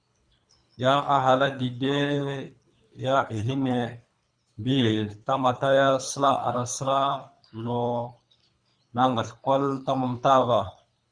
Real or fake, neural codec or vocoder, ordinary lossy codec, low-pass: fake; codec, 24 kHz, 3 kbps, HILCodec; Opus, 64 kbps; 9.9 kHz